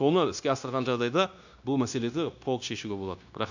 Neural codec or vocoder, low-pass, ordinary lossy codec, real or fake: codec, 16 kHz, 0.9 kbps, LongCat-Audio-Codec; 7.2 kHz; none; fake